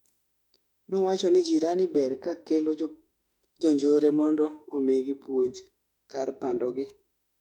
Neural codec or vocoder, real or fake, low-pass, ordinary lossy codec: autoencoder, 48 kHz, 32 numbers a frame, DAC-VAE, trained on Japanese speech; fake; 19.8 kHz; none